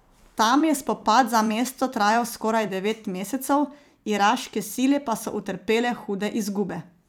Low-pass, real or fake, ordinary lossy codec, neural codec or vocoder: none; fake; none; vocoder, 44.1 kHz, 128 mel bands every 256 samples, BigVGAN v2